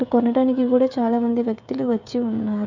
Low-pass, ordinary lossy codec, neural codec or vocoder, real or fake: 7.2 kHz; none; codec, 16 kHz, 16 kbps, FreqCodec, smaller model; fake